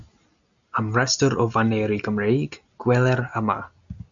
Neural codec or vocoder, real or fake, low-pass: none; real; 7.2 kHz